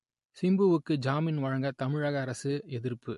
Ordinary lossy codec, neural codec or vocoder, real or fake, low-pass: MP3, 48 kbps; none; real; 14.4 kHz